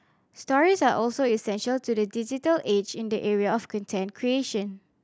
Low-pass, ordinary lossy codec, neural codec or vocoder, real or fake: none; none; none; real